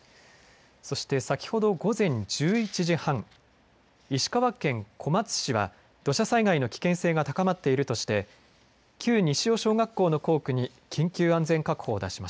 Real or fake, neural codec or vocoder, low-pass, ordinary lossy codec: real; none; none; none